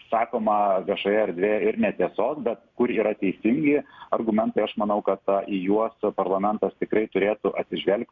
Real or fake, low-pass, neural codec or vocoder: real; 7.2 kHz; none